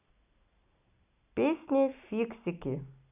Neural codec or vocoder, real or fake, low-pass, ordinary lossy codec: none; real; 3.6 kHz; none